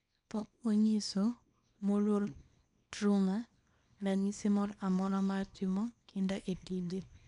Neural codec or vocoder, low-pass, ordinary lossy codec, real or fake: codec, 24 kHz, 0.9 kbps, WavTokenizer, small release; 10.8 kHz; none; fake